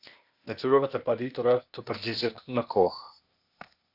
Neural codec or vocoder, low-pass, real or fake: codec, 16 kHz, 0.8 kbps, ZipCodec; 5.4 kHz; fake